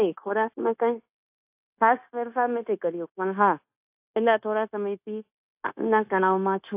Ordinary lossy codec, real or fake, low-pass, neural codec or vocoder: AAC, 32 kbps; fake; 3.6 kHz; codec, 16 kHz, 0.9 kbps, LongCat-Audio-Codec